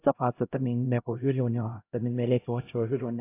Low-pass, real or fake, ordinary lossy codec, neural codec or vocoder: 3.6 kHz; fake; AAC, 24 kbps; codec, 16 kHz, 0.5 kbps, X-Codec, HuBERT features, trained on LibriSpeech